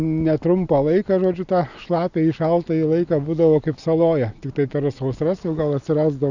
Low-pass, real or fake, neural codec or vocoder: 7.2 kHz; real; none